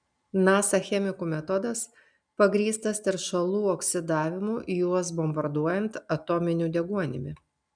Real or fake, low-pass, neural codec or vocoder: real; 9.9 kHz; none